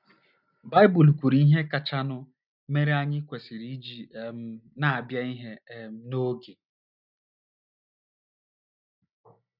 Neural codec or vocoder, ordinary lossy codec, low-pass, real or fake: none; none; 5.4 kHz; real